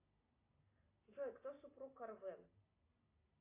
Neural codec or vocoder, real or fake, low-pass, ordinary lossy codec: none; real; 3.6 kHz; AAC, 24 kbps